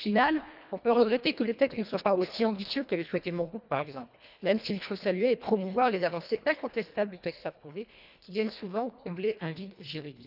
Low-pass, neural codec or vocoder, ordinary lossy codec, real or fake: 5.4 kHz; codec, 24 kHz, 1.5 kbps, HILCodec; none; fake